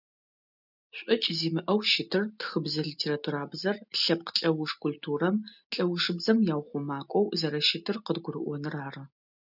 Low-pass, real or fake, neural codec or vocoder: 5.4 kHz; real; none